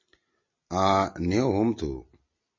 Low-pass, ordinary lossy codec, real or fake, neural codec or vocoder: 7.2 kHz; MP3, 32 kbps; real; none